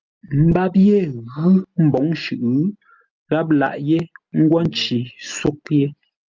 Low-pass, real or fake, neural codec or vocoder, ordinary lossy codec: 7.2 kHz; real; none; Opus, 24 kbps